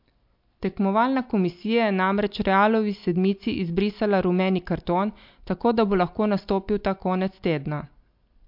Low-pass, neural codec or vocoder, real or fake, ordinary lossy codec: 5.4 kHz; none; real; MP3, 48 kbps